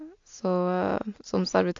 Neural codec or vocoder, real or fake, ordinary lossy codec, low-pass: none; real; AAC, 48 kbps; 7.2 kHz